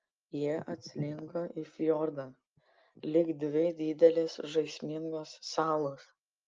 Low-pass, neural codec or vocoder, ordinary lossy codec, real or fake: 7.2 kHz; none; Opus, 24 kbps; real